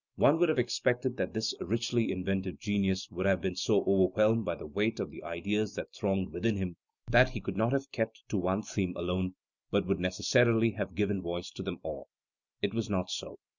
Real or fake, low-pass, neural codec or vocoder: real; 7.2 kHz; none